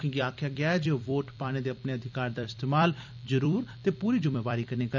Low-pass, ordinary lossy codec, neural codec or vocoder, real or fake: 7.2 kHz; none; vocoder, 44.1 kHz, 128 mel bands every 256 samples, BigVGAN v2; fake